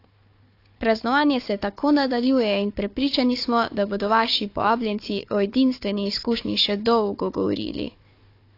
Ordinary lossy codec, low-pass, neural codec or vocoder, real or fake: AAC, 32 kbps; 5.4 kHz; codec, 16 kHz, 4 kbps, FunCodec, trained on Chinese and English, 50 frames a second; fake